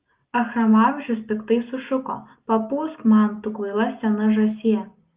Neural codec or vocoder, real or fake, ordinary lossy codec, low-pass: none; real; Opus, 32 kbps; 3.6 kHz